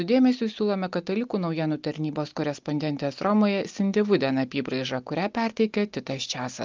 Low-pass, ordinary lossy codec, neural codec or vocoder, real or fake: 7.2 kHz; Opus, 24 kbps; none; real